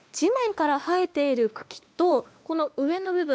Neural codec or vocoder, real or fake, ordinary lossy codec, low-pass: codec, 16 kHz, 2 kbps, X-Codec, WavLM features, trained on Multilingual LibriSpeech; fake; none; none